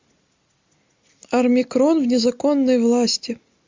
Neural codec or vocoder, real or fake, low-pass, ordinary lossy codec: none; real; 7.2 kHz; MP3, 64 kbps